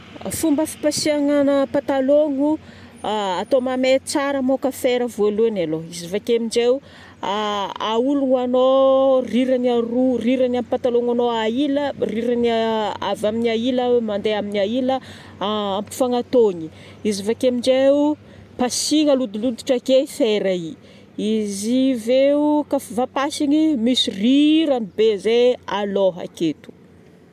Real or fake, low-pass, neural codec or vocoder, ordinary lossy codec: real; 14.4 kHz; none; MP3, 96 kbps